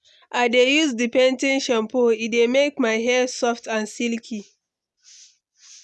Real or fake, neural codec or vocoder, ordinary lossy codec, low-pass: real; none; none; none